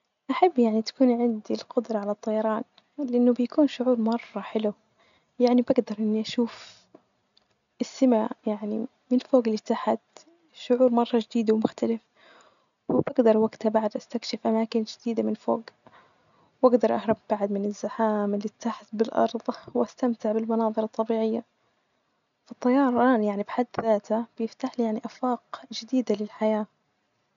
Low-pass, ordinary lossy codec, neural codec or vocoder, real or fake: 7.2 kHz; none; none; real